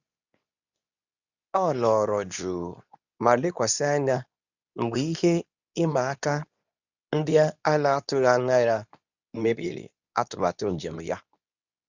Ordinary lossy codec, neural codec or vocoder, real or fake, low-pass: none; codec, 24 kHz, 0.9 kbps, WavTokenizer, medium speech release version 2; fake; 7.2 kHz